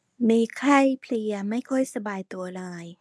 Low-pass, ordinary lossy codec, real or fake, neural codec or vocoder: none; none; fake; codec, 24 kHz, 0.9 kbps, WavTokenizer, medium speech release version 1